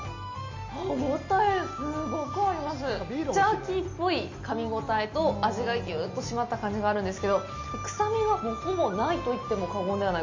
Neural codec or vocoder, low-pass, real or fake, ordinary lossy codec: none; 7.2 kHz; real; MP3, 64 kbps